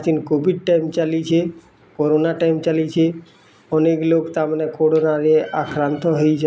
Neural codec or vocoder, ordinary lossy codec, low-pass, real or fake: none; none; none; real